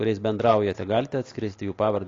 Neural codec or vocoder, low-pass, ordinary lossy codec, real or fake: none; 7.2 kHz; AAC, 32 kbps; real